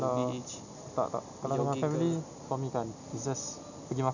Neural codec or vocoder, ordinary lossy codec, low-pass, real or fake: none; none; 7.2 kHz; real